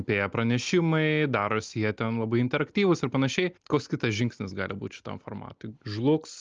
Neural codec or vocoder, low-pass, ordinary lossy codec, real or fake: none; 7.2 kHz; Opus, 24 kbps; real